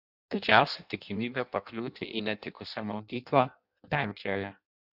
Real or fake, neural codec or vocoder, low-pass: fake; codec, 16 kHz in and 24 kHz out, 0.6 kbps, FireRedTTS-2 codec; 5.4 kHz